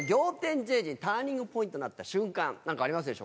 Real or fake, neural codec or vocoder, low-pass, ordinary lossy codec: real; none; none; none